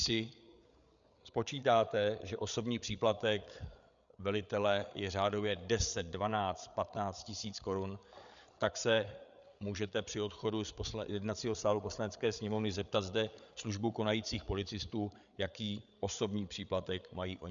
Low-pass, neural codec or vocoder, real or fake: 7.2 kHz; codec, 16 kHz, 8 kbps, FreqCodec, larger model; fake